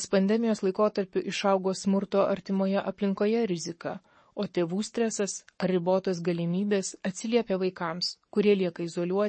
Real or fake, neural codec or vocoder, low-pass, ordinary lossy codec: fake; codec, 44.1 kHz, 7.8 kbps, Pupu-Codec; 9.9 kHz; MP3, 32 kbps